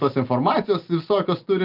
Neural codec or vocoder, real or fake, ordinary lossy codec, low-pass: none; real; Opus, 32 kbps; 5.4 kHz